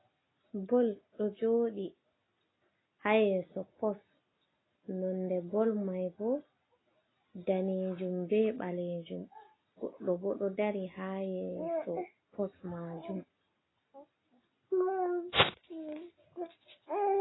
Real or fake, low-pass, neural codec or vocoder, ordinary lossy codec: real; 7.2 kHz; none; AAC, 16 kbps